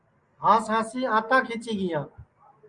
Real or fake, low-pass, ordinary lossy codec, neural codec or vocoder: real; 9.9 kHz; Opus, 32 kbps; none